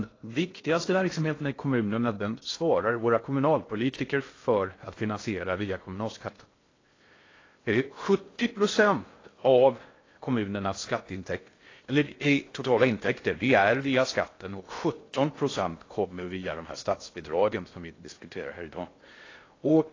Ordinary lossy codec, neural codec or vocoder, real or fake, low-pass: AAC, 32 kbps; codec, 16 kHz in and 24 kHz out, 0.6 kbps, FocalCodec, streaming, 2048 codes; fake; 7.2 kHz